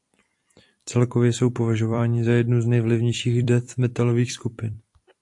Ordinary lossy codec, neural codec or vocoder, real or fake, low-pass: AAC, 64 kbps; vocoder, 44.1 kHz, 128 mel bands every 256 samples, BigVGAN v2; fake; 10.8 kHz